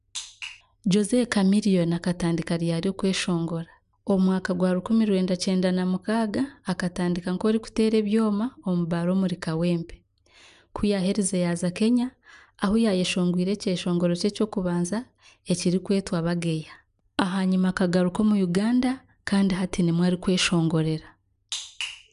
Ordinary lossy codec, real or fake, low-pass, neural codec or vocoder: none; real; 10.8 kHz; none